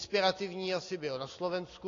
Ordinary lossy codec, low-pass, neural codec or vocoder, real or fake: AAC, 32 kbps; 7.2 kHz; none; real